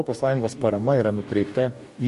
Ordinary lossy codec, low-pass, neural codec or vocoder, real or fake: MP3, 48 kbps; 14.4 kHz; codec, 44.1 kHz, 2.6 kbps, DAC; fake